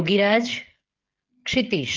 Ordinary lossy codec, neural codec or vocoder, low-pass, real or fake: Opus, 16 kbps; codec, 16 kHz, 16 kbps, FreqCodec, smaller model; 7.2 kHz; fake